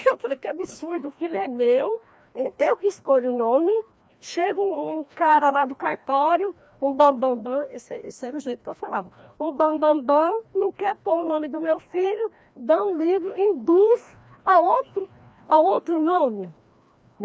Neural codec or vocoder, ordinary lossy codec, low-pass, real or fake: codec, 16 kHz, 1 kbps, FreqCodec, larger model; none; none; fake